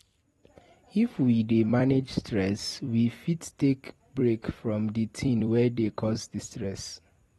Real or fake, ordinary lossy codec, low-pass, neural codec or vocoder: fake; AAC, 32 kbps; 19.8 kHz; vocoder, 44.1 kHz, 128 mel bands every 256 samples, BigVGAN v2